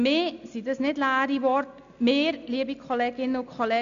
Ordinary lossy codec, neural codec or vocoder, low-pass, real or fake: none; none; 7.2 kHz; real